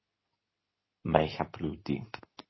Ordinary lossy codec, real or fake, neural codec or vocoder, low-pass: MP3, 24 kbps; fake; codec, 24 kHz, 0.9 kbps, WavTokenizer, medium speech release version 2; 7.2 kHz